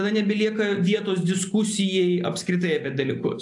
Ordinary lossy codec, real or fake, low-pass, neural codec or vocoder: AAC, 64 kbps; real; 10.8 kHz; none